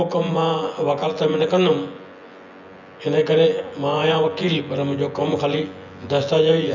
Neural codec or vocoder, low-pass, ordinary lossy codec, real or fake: vocoder, 24 kHz, 100 mel bands, Vocos; 7.2 kHz; none; fake